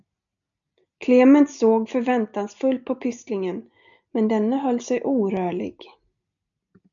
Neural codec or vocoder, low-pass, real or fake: none; 7.2 kHz; real